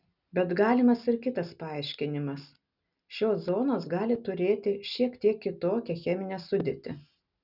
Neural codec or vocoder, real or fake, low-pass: none; real; 5.4 kHz